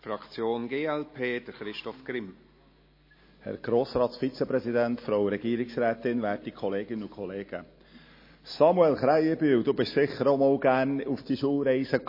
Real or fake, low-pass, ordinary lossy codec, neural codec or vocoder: real; 5.4 kHz; MP3, 24 kbps; none